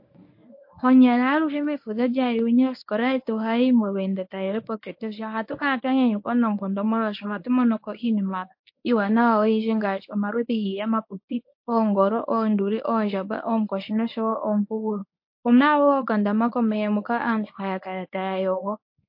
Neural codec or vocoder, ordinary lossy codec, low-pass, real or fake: codec, 24 kHz, 0.9 kbps, WavTokenizer, medium speech release version 1; MP3, 32 kbps; 5.4 kHz; fake